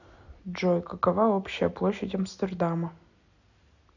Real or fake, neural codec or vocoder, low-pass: real; none; 7.2 kHz